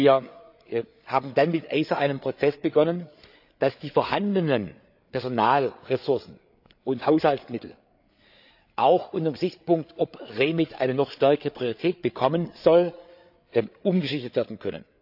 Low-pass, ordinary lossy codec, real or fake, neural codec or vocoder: 5.4 kHz; AAC, 48 kbps; fake; codec, 16 kHz, 8 kbps, FreqCodec, larger model